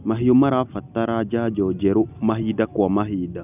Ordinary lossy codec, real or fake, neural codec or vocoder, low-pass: none; real; none; 3.6 kHz